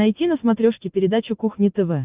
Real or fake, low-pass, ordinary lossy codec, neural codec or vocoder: real; 3.6 kHz; Opus, 32 kbps; none